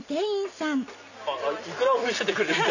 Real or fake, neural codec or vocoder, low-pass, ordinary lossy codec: real; none; 7.2 kHz; none